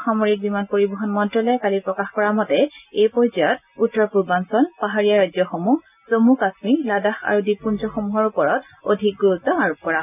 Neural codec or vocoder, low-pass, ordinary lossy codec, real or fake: none; 3.6 kHz; none; real